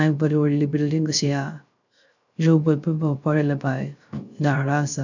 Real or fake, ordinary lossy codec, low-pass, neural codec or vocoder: fake; none; 7.2 kHz; codec, 16 kHz, 0.3 kbps, FocalCodec